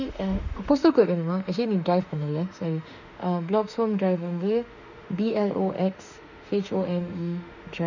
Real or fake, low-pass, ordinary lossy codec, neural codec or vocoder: fake; 7.2 kHz; none; autoencoder, 48 kHz, 32 numbers a frame, DAC-VAE, trained on Japanese speech